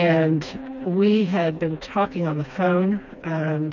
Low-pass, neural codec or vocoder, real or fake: 7.2 kHz; codec, 16 kHz, 2 kbps, FreqCodec, smaller model; fake